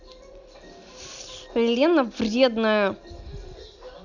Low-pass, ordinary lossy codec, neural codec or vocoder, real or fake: 7.2 kHz; none; none; real